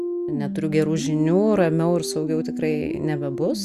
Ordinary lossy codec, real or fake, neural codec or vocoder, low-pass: Opus, 64 kbps; real; none; 14.4 kHz